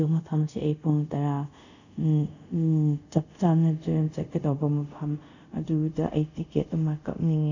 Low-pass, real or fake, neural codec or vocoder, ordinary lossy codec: 7.2 kHz; fake; codec, 24 kHz, 0.5 kbps, DualCodec; none